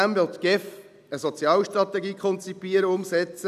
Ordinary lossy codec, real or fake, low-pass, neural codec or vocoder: none; real; 14.4 kHz; none